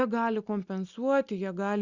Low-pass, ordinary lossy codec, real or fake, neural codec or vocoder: 7.2 kHz; Opus, 64 kbps; real; none